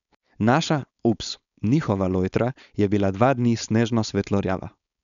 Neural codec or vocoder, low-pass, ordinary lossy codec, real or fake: codec, 16 kHz, 4.8 kbps, FACodec; 7.2 kHz; none; fake